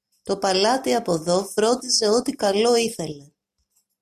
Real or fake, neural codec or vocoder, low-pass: real; none; 14.4 kHz